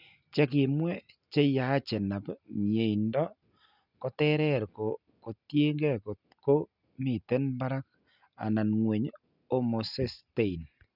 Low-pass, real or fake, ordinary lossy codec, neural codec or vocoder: 5.4 kHz; real; none; none